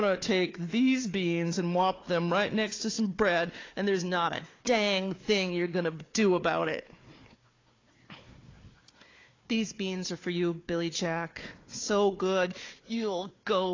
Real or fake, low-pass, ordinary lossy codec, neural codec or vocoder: fake; 7.2 kHz; AAC, 32 kbps; codec, 16 kHz, 4 kbps, FunCodec, trained on Chinese and English, 50 frames a second